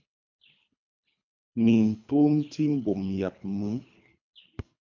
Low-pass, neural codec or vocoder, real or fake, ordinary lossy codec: 7.2 kHz; codec, 24 kHz, 3 kbps, HILCodec; fake; MP3, 64 kbps